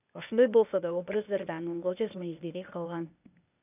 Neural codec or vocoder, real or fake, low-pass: codec, 16 kHz, 0.8 kbps, ZipCodec; fake; 3.6 kHz